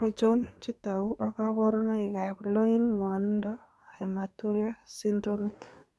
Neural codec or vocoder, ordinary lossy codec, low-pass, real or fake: codec, 24 kHz, 1 kbps, SNAC; none; none; fake